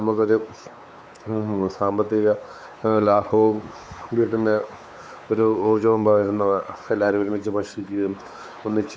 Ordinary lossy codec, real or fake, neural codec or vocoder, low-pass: none; fake; codec, 16 kHz, 4 kbps, X-Codec, WavLM features, trained on Multilingual LibriSpeech; none